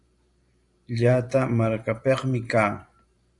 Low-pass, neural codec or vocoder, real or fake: 10.8 kHz; vocoder, 44.1 kHz, 128 mel bands every 512 samples, BigVGAN v2; fake